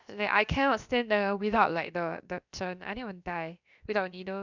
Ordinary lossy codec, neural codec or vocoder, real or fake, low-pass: none; codec, 16 kHz, about 1 kbps, DyCAST, with the encoder's durations; fake; 7.2 kHz